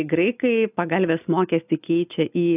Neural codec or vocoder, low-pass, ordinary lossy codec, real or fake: none; 3.6 kHz; AAC, 32 kbps; real